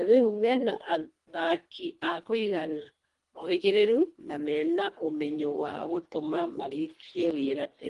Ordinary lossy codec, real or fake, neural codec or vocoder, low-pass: Opus, 24 kbps; fake; codec, 24 kHz, 1.5 kbps, HILCodec; 10.8 kHz